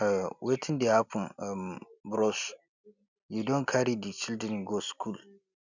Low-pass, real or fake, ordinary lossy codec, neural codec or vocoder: 7.2 kHz; real; none; none